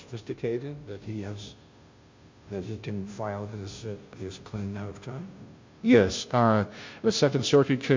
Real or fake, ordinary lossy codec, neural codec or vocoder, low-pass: fake; MP3, 48 kbps; codec, 16 kHz, 0.5 kbps, FunCodec, trained on Chinese and English, 25 frames a second; 7.2 kHz